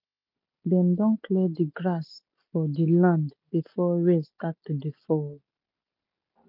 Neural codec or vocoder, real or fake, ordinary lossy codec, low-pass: none; real; none; 5.4 kHz